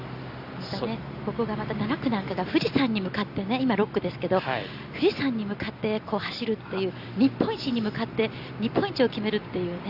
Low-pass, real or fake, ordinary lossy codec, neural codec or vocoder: 5.4 kHz; real; Opus, 64 kbps; none